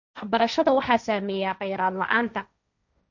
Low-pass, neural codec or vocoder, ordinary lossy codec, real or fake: 7.2 kHz; codec, 16 kHz, 1.1 kbps, Voila-Tokenizer; none; fake